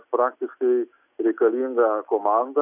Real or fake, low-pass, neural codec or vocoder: real; 3.6 kHz; none